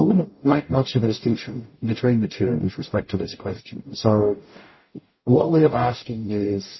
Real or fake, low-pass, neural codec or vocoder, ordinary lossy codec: fake; 7.2 kHz; codec, 44.1 kHz, 0.9 kbps, DAC; MP3, 24 kbps